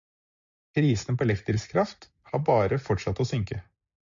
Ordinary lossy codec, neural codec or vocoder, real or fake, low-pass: AAC, 64 kbps; none; real; 7.2 kHz